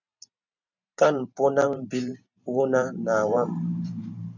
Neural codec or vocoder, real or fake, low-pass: vocoder, 44.1 kHz, 128 mel bands every 512 samples, BigVGAN v2; fake; 7.2 kHz